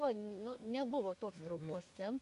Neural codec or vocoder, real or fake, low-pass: codec, 24 kHz, 1 kbps, SNAC; fake; 10.8 kHz